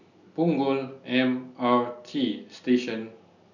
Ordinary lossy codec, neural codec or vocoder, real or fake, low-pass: none; none; real; 7.2 kHz